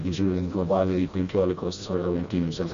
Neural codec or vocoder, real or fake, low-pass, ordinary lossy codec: codec, 16 kHz, 1 kbps, FreqCodec, smaller model; fake; 7.2 kHz; Opus, 64 kbps